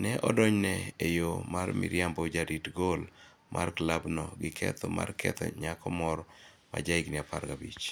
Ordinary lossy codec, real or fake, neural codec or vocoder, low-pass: none; real; none; none